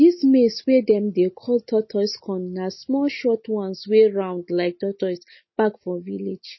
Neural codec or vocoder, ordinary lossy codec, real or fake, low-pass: none; MP3, 24 kbps; real; 7.2 kHz